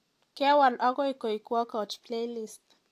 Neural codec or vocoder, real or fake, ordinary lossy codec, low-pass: none; real; AAC, 64 kbps; 14.4 kHz